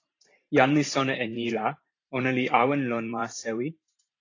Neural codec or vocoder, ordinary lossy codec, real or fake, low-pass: vocoder, 44.1 kHz, 128 mel bands every 512 samples, BigVGAN v2; AAC, 32 kbps; fake; 7.2 kHz